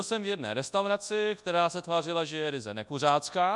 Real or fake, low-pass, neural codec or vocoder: fake; 10.8 kHz; codec, 24 kHz, 0.9 kbps, WavTokenizer, large speech release